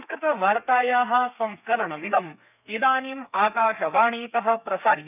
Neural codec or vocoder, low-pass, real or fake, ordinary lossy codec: codec, 32 kHz, 1.9 kbps, SNAC; 3.6 kHz; fake; none